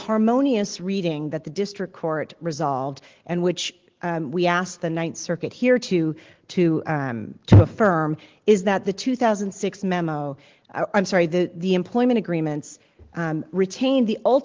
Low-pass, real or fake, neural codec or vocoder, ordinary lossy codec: 7.2 kHz; real; none; Opus, 16 kbps